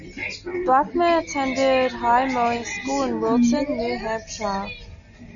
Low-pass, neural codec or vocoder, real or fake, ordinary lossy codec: 7.2 kHz; none; real; MP3, 96 kbps